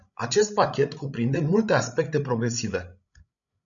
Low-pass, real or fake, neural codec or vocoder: 7.2 kHz; fake; codec, 16 kHz, 16 kbps, FreqCodec, larger model